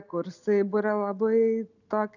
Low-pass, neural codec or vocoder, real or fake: 7.2 kHz; none; real